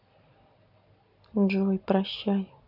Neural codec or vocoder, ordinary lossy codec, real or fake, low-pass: none; none; real; 5.4 kHz